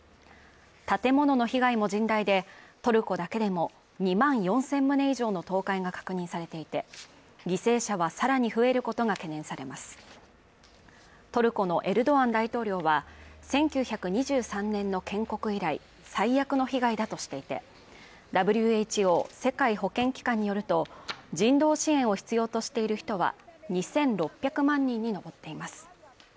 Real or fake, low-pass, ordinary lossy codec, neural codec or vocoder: real; none; none; none